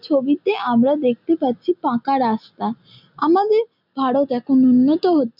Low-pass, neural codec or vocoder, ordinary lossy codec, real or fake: 5.4 kHz; none; none; real